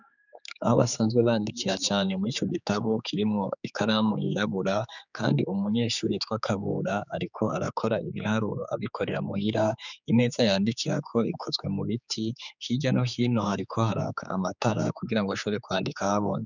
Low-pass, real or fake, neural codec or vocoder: 7.2 kHz; fake; codec, 16 kHz, 4 kbps, X-Codec, HuBERT features, trained on general audio